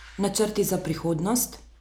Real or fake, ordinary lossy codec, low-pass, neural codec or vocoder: real; none; none; none